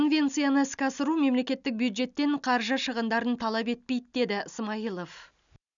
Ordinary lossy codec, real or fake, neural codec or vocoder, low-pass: none; real; none; 7.2 kHz